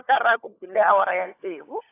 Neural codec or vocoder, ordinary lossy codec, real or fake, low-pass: codec, 16 kHz, 16 kbps, FunCodec, trained on LibriTTS, 50 frames a second; AAC, 24 kbps; fake; 3.6 kHz